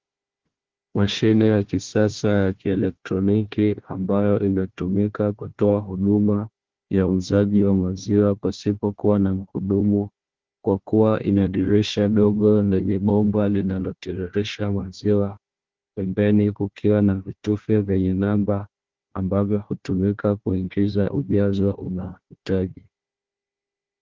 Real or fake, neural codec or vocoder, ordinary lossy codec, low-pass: fake; codec, 16 kHz, 1 kbps, FunCodec, trained on Chinese and English, 50 frames a second; Opus, 16 kbps; 7.2 kHz